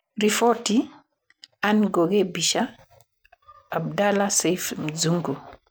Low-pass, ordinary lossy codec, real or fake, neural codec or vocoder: none; none; real; none